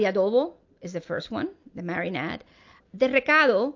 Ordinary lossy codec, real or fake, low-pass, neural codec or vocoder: MP3, 48 kbps; real; 7.2 kHz; none